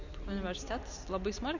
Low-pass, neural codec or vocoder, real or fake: 7.2 kHz; none; real